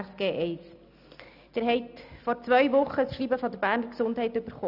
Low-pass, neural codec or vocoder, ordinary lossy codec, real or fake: 5.4 kHz; none; none; real